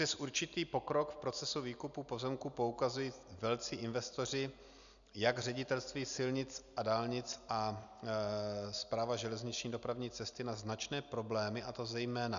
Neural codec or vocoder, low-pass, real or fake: none; 7.2 kHz; real